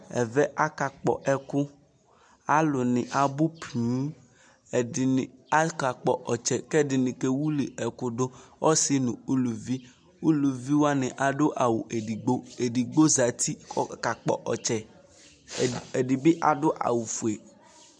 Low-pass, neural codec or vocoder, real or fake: 9.9 kHz; none; real